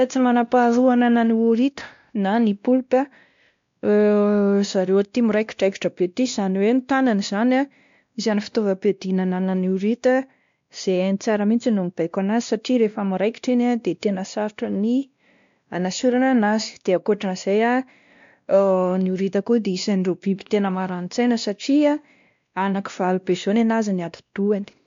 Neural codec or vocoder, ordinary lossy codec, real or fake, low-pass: codec, 16 kHz, 1 kbps, X-Codec, WavLM features, trained on Multilingual LibriSpeech; MP3, 64 kbps; fake; 7.2 kHz